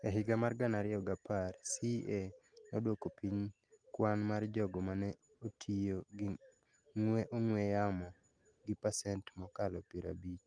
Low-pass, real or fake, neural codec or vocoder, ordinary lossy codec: 9.9 kHz; real; none; Opus, 32 kbps